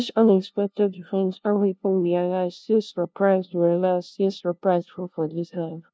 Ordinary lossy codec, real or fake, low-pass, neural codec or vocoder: none; fake; none; codec, 16 kHz, 0.5 kbps, FunCodec, trained on LibriTTS, 25 frames a second